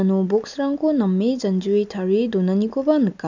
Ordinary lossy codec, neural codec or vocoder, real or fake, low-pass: none; none; real; 7.2 kHz